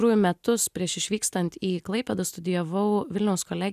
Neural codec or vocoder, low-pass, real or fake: none; 14.4 kHz; real